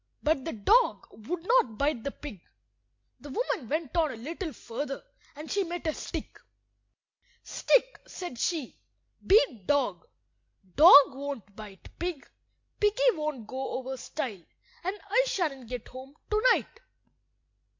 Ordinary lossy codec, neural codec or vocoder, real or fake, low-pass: MP3, 48 kbps; none; real; 7.2 kHz